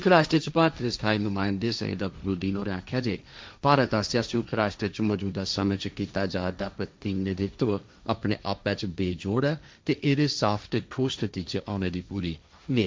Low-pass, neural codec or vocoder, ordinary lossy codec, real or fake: none; codec, 16 kHz, 1.1 kbps, Voila-Tokenizer; none; fake